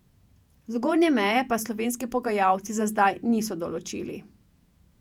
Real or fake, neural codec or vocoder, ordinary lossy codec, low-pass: fake; vocoder, 48 kHz, 128 mel bands, Vocos; none; 19.8 kHz